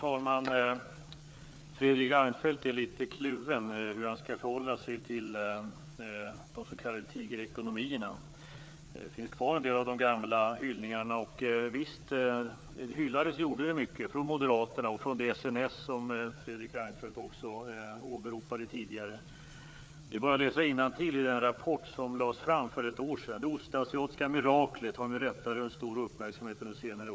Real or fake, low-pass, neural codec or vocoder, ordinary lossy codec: fake; none; codec, 16 kHz, 4 kbps, FreqCodec, larger model; none